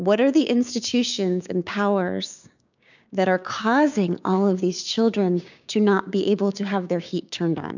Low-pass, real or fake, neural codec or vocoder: 7.2 kHz; fake; codec, 16 kHz, 6 kbps, DAC